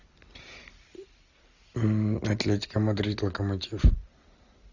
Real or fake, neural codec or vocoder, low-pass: real; none; 7.2 kHz